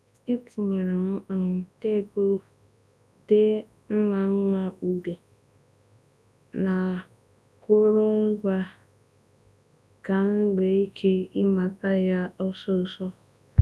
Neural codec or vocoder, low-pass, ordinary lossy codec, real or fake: codec, 24 kHz, 0.9 kbps, WavTokenizer, large speech release; none; none; fake